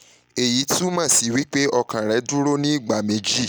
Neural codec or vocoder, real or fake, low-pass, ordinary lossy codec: none; real; none; none